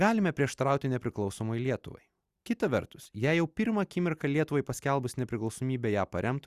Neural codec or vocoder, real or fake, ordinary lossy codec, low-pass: none; real; Opus, 64 kbps; 14.4 kHz